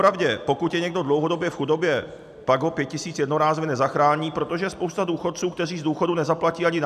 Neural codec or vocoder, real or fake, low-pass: none; real; 14.4 kHz